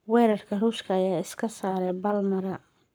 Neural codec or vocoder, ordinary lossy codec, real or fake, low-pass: codec, 44.1 kHz, 7.8 kbps, Pupu-Codec; none; fake; none